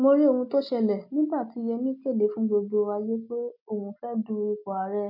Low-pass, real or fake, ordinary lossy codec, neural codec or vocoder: 5.4 kHz; real; none; none